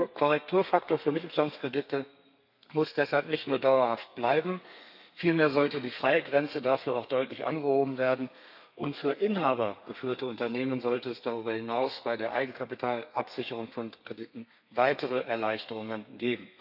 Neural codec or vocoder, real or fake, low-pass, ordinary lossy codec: codec, 32 kHz, 1.9 kbps, SNAC; fake; 5.4 kHz; none